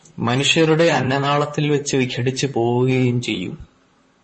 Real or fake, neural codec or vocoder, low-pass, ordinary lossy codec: fake; vocoder, 44.1 kHz, 128 mel bands, Pupu-Vocoder; 10.8 kHz; MP3, 32 kbps